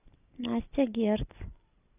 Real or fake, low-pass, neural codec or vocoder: real; 3.6 kHz; none